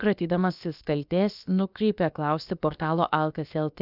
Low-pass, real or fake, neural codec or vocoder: 5.4 kHz; fake; codec, 16 kHz, about 1 kbps, DyCAST, with the encoder's durations